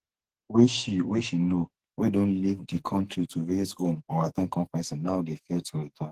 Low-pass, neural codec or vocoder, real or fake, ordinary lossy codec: 14.4 kHz; codec, 44.1 kHz, 2.6 kbps, SNAC; fake; Opus, 16 kbps